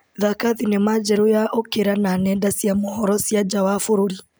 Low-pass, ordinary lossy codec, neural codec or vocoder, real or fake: none; none; none; real